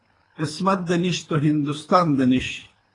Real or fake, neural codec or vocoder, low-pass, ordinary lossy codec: fake; codec, 24 kHz, 3 kbps, HILCodec; 10.8 kHz; AAC, 32 kbps